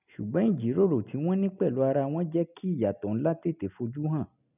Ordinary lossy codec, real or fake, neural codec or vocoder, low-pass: none; real; none; 3.6 kHz